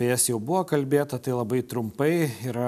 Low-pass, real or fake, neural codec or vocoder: 14.4 kHz; real; none